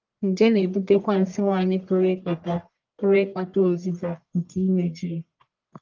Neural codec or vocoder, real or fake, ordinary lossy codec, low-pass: codec, 44.1 kHz, 1.7 kbps, Pupu-Codec; fake; Opus, 24 kbps; 7.2 kHz